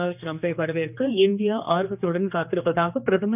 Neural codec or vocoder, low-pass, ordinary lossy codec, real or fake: codec, 16 kHz, 2 kbps, X-Codec, HuBERT features, trained on general audio; 3.6 kHz; none; fake